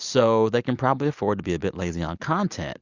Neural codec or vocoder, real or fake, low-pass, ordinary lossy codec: none; real; 7.2 kHz; Opus, 64 kbps